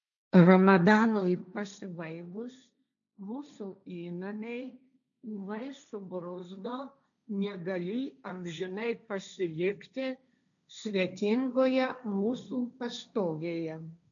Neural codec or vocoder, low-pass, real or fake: codec, 16 kHz, 1.1 kbps, Voila-Tokenizer; 7.2 kHz; fake